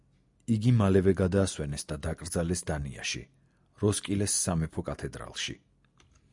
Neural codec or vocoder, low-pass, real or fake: none; 10.8 kHz; real